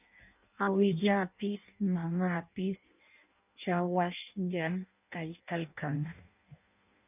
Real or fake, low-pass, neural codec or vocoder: fake; 3.6 kHz; codec, 16 kHz in and 24 kHz out, 0.6 kbps, FireRedTTS-2 codec